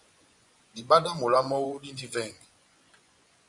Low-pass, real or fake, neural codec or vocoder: 10.8 kHz; fake; vocoder, 24 kHz, 100 mel bands, Vocos